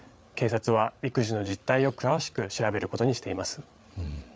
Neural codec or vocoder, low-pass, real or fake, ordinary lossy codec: codec, 16 kHz, 8 kbps, FreqCodec, larger model; none; fake; none